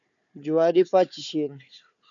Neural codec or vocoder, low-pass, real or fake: codec, 16 kHz, 4 kbps, FunCodec, trained on Chinese and English, 50 frames a second; 7.2 kHz; fake